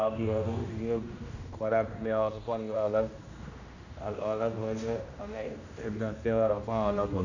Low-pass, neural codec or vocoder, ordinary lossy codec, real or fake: 7.2 kHz; codec, 16 kHz, 1 kbps, X-Codec, HuBERT features, trained on general audio; none; fake